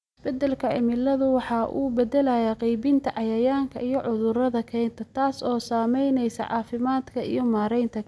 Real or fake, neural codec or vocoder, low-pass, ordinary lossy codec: real; none; none; none